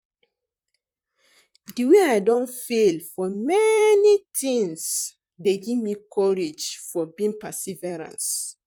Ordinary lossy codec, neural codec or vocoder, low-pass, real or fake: none; vocoder, 44.1 kHz, 128 mel bands, Pupu-Vocoder; 19.8 kHz; fake